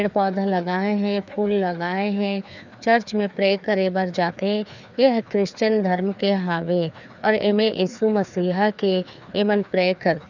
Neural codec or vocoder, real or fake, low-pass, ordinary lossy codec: codec, 16 kHz, 2 kbps, FreqCodec, larger model; fake; 7.2 kHz; none